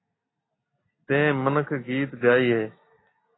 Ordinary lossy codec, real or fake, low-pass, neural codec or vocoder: AAC, 16 kbps; real; 7.2 kHz; none